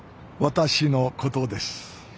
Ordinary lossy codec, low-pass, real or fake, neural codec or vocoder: none; none; real; none